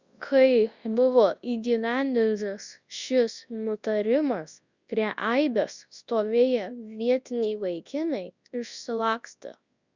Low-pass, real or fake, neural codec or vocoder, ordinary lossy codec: 7.2 kHz; fake; codec, 24 kHz, 0.9 kbps, WavTokenizer, large speech release; Opus, 64 kbps